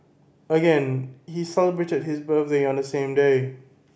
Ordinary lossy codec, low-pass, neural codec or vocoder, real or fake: none; none; none; real